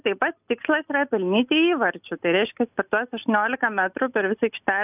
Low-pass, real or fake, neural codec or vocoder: 3.6 kHz; real; none